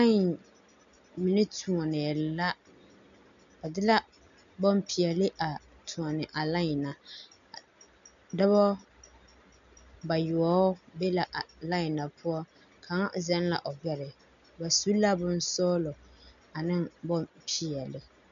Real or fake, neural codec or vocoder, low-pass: real; none; 7.2 kHz